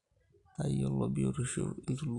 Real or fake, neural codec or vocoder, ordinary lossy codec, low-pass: real; none; AAC, 64 kbps; 10.8 kHz